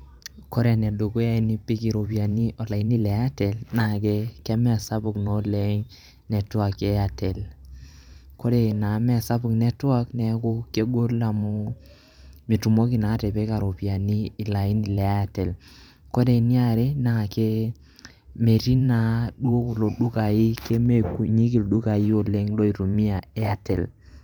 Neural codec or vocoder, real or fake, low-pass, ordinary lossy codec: vocoder, 44.1 kHz, 128 mel bands every 512 samples, BigVGAN v2; fake; 19.8 kHz; none